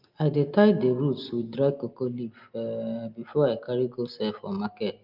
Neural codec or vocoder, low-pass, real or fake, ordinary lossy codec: none; 5.4 kHz; real; Opus, 24 kbps